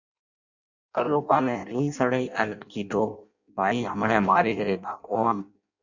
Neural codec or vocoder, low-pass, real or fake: codec, 16 kHz in and 24 kHz out, 0.6 kbps, FireRedTTS-2 codec; 7.2 kHz; fake